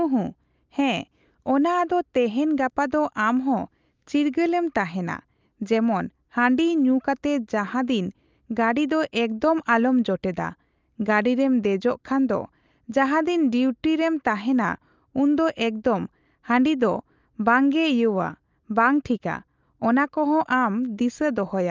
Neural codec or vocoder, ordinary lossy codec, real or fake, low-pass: none; Opus, 24 kbps; real; 7.2 kHz